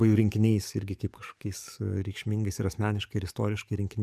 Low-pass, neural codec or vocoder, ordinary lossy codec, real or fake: 14.4 kHz; codec, 44.1 kHz, 7.8 kbps, DAC; MP3, 96 kbps; fake